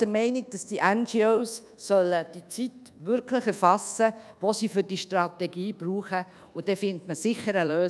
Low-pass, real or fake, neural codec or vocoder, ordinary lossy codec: none; fake; codec, 24 kHz, 1.2 kbps, DualCodec; none